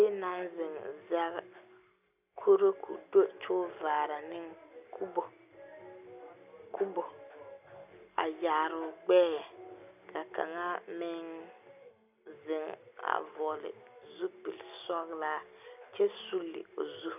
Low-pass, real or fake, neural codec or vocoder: 3.6 kHz; real; none